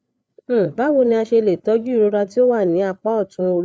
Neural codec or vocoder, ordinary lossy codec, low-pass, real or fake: codec, 16 kHz, 8 kbps, FreqCodec, larger model; none; none; fake